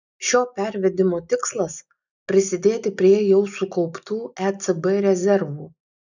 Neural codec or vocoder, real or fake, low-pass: none; real; 7.2 kHz